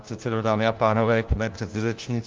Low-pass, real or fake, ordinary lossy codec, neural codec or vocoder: 7.2 kHz; fake; Opus, 24 kbps; codec, 16 kHz, 1 kbps, FunCodec, trained on LibriTTS, 50 frames a second